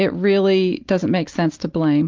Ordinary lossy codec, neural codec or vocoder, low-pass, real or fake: Opus, 24 kbps; none; 7.2 kHz; real